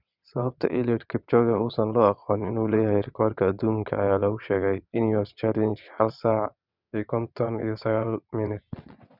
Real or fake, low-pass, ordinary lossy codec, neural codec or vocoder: fake; 5.4 kHz; none; vocoder, 22.05 kHz, 80 mel bands, WaveNeXt